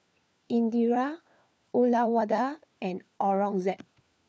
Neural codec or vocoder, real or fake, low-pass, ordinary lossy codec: codec, 16 kHz, 4 kbps, FunCodec, trained on LibriTTS, 50 frames a second; fake; none; none